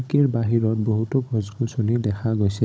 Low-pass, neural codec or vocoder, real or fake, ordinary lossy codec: none; codec, 16 kHz, 16 kbps, FunCodec, trained on Chinese and English, 50 frames a second; fake; none